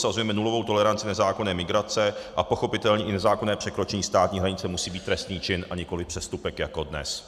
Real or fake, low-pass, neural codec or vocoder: real; 14.4 kHz; none